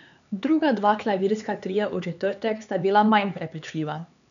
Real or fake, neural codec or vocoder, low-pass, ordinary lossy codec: fake; codec, 16 kHz, 4 kbps, X-Codec, HuBERT features, trained on LibriSpeech; 7.2 kHz; none